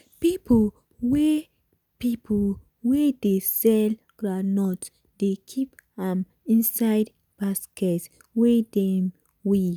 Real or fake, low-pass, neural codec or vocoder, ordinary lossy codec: real; none; none; none